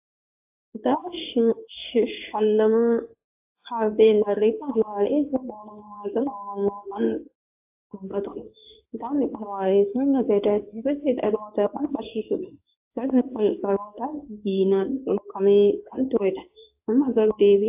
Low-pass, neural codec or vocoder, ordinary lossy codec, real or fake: 3.6 kHz; codec, 16 kHz in and 24 kHz out, 1 kbps, XY-Tokenizer; AAC, 32 kbps; fake